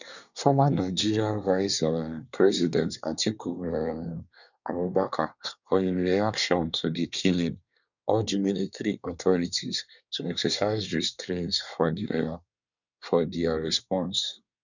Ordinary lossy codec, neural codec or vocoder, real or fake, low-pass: none; codec, 24 kHz, 1 kbps, SNAC; fake; 7.2 kHz